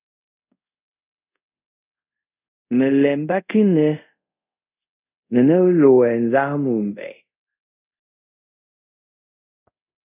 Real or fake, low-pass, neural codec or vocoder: fake; 3.6 kHz; codec, 24 kHz, 0.5 kbps, DualCodec